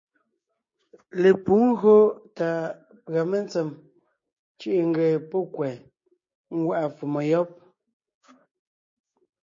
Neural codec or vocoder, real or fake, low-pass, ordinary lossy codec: codec, 16 kHz, 6 kbps, DAC; fake; 7.2 kHz; MP3, 32 kbps